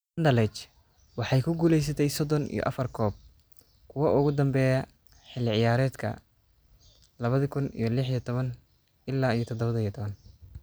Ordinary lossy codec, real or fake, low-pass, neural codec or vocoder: none; real; none; none